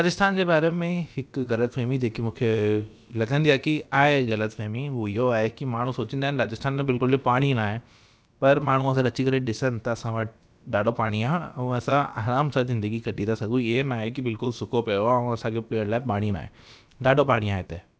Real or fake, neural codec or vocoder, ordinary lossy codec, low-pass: fake; codec, 16 kHz, about 1 kbps, DyCAST, with the encoder's durations; none; none